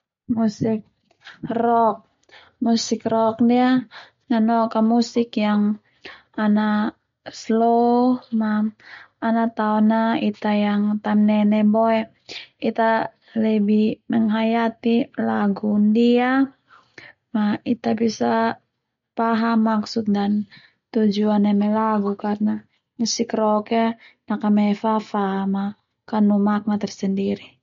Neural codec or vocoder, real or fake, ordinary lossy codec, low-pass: none; real; MP3, 48 kbps; 7.2 kHz